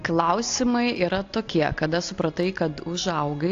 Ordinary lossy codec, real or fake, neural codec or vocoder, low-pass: AAC, 48 kbps; real; none; 7.2 kHz